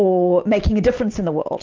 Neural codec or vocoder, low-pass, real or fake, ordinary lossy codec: none; 7.2 kHz; real; Opus, 32 kbps